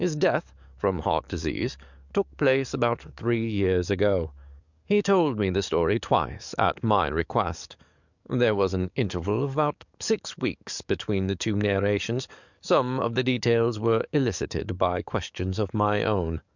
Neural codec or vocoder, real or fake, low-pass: codec, 44.1 kHz, 7.8 kbps, DAC; fake; 7.2 kHz